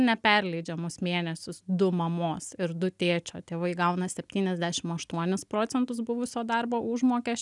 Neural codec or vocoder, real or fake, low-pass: none; real; 10.8 kHz